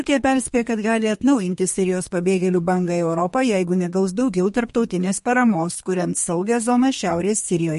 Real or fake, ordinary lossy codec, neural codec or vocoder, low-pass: fake; MP3, 48 kbps; codec, 32 kHz, 1.9 kbps, SNAC; 14.4 kHz